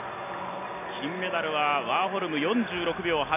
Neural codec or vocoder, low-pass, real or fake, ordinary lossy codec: none; 3.6 kHz; real; none